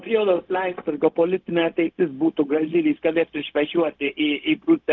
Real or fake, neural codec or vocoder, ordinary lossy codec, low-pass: fake; codec, 16 kHz, 0.4 kbps, LongCat-Audio-Codec; Opus, 32 kbps; 7.2 kHz